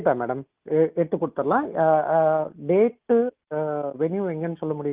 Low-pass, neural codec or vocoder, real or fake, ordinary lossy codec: 3.6 kHz; none; real; Opus, 32 kbps